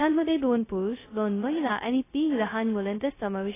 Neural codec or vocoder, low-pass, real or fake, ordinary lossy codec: codec, 16 kHz, 0.2 kbps, FocalCodec; 3.6 kHz; fake; AAC, 16 kbps